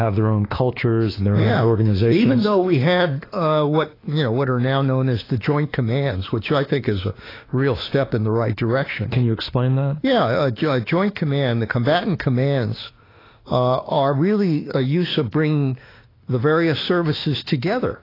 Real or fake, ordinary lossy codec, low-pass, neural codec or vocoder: fake; AAC, 24 kbps; 5.4 kHz; autoencoder, 48 kHz, 32 numbers a frame, DAC-VAE, trained on Japanese speech